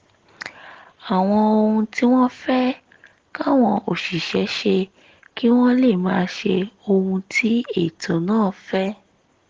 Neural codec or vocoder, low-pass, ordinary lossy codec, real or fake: none; 7.2 kHz; Opus, 16 kbps; real